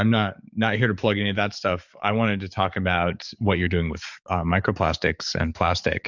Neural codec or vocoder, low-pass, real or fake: codec, 44.1 kHz, 7.8 kbps, DAC; 7.2 kHz; fake